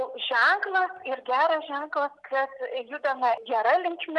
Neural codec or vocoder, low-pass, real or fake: none; 10.8 kHz; real